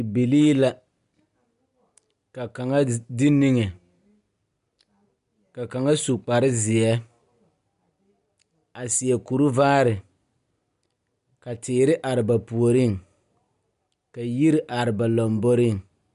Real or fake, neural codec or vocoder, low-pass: real; none; 10.8 kHz